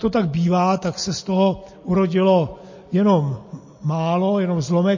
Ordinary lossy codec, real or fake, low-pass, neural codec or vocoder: MP3, 32 kbps; real; 7.2 kHz; none